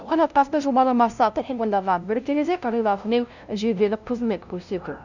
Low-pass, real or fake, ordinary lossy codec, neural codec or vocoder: 7.2 kHz; fake; none; codec, 16 kHz, 0.5 kbps, FunCodec, trained on LibriTTS, 25 frames a second